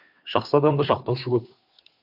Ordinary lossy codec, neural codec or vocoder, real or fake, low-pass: AAC, 48 kbps; codec, 16 kHz, 2 kbps, FunCodec, trained on Chinese and English, 25 frames a second; fake; 5.4 kHz